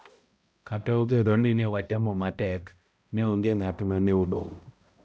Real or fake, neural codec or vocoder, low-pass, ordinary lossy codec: fake; codec, 16 kHz, 0.5 kbps, X-Codec, HuBERT features, trained on balanced general audio; none; none